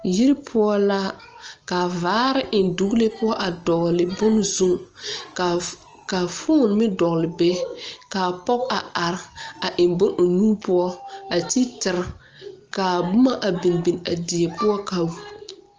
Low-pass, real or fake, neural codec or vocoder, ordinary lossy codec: 7.2 kHz; real; none; Opus, 24 kbps